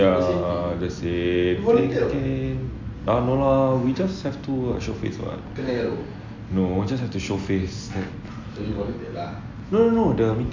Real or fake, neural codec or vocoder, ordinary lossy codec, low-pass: real; none; MP3, 64 kbps; 7.2 kHz